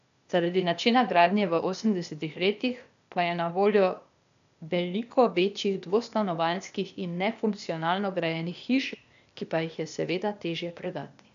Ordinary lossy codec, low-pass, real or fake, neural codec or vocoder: AAC, 96 kbps; 7.2 kHz; fake; codec, 16 kHz, 0.8 kbps, ZipCodec